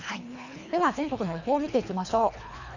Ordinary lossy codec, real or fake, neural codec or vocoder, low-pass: none; fake; codec, 24 kHz, 3 kbps, HILCodec; 7.2 kHz